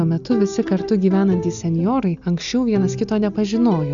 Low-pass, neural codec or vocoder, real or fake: 7.2 kHz; none; real